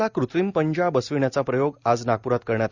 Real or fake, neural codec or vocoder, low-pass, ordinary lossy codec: real; none; 7.2 kHz; Opus, 64 kbps